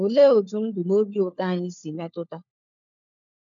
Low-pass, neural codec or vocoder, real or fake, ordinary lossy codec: 7.2 kHz; codec, 16 kHz, 4 kbps, FunCodec, trained on LibriTTS, 50 frames a second; fake; MP3, 64 kbps